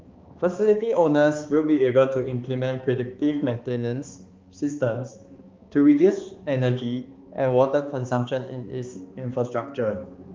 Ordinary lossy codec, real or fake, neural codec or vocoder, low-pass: Opus, 24 kbps; fake; codec, 16 kHz, 2 kbps, X-Codec, HuBERT features, trained on balanced general audio; 7.2 kHz